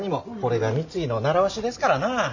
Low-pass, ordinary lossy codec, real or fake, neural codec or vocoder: 7.2 kHz; none; real; none